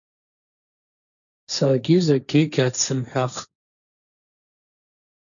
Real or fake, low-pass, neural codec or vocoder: fake; 7.2 kHz; codec, 16 kHz, 1.1 kbps, Voila-Tokenizer